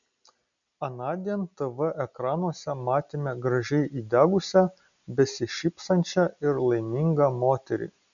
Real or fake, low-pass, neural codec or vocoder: real; 7.2 kHz; none